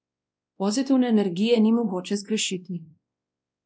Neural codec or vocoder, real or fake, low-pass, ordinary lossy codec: codec, 16 kHz, 1 kbps, X-Codec, WavLM features, trained on Multilingual LibriSpeech; fake; none; none